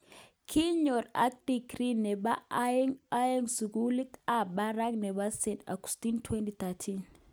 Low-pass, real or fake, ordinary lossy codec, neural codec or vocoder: none; real; none; none